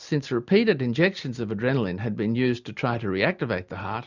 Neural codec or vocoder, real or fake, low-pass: none; real; 7.2 kHz